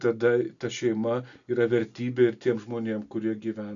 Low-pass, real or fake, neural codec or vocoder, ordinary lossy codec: 7.2 kHz; real; none; MP3, 96 kbps